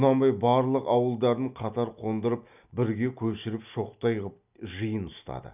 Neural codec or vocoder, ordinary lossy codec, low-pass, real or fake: none; none; 3.6 kHz; real